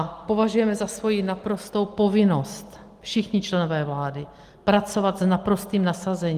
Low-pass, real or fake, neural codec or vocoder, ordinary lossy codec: 14.4 kHz; real; none; Opus, 24 kbps